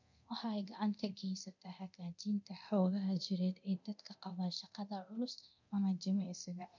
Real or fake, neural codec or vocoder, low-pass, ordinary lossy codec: fake; codec, 24 kHz, 0.9 kbps, DualCodec; 7.2 kHz; none